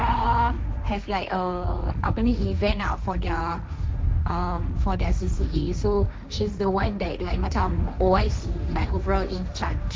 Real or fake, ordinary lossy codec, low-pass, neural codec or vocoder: fake; none; none; codec, 16 kHz, 1.1 kbps, Voila-Tokenizer